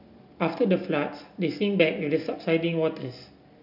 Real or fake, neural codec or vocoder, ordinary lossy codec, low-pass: real; none; none; 5.4 kHz